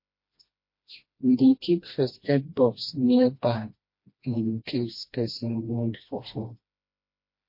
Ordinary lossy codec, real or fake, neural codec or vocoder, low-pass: MP3, 32 kbps; fake; codec, 16 kHz, 1 kbps, FreqCodec, smaller model; 5.4 kHz